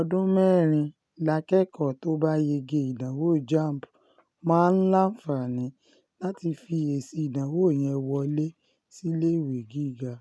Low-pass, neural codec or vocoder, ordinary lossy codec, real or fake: none; none; none; real